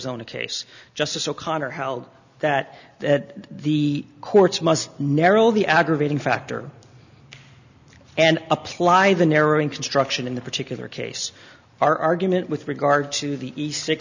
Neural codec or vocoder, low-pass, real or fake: none; 7.2 kHz; real